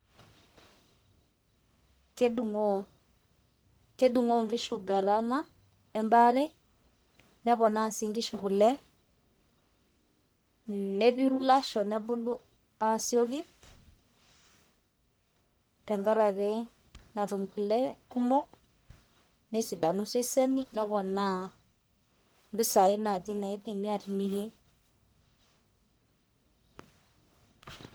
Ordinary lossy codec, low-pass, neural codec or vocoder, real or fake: none; none; codec, 44.1 kHz, 1.7 kbps, Pupu-Codec; fake